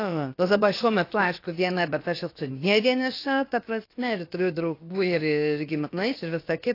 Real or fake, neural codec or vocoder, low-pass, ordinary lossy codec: fake; codec, 16 kHz, about 1 kbps, DyCAST, with the encoder's durations; 5.4 kHz; AAC, 32 kbps